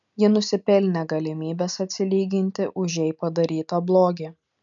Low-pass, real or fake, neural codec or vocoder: 7.2 kHz; real; none